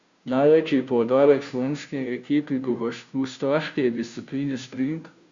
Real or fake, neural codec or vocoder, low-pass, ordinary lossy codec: fake; codec, 16 kHz, 0.5 kbps, FunCodec, trained on Chinese and English, 25 frames a second; 7.2 kHz; Opus, 64 kbps